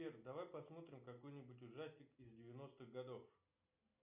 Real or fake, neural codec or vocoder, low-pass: real; none; 3.6 kHz